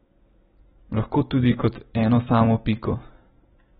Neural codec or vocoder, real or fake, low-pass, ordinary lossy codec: none; real; 19.8 kHz; AAC, 16 kbps